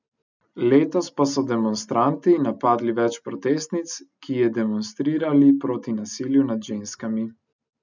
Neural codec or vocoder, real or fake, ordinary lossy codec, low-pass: none; real; none; 7.2 kHz